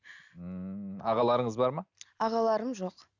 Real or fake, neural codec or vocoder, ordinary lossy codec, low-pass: real; none; none; 7.2 kHz